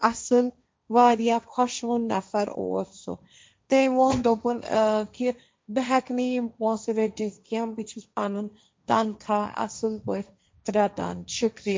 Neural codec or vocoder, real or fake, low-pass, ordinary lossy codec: codec, 16 kHz, 1.1 kbps, Voila-Tokenizer; fake; none; none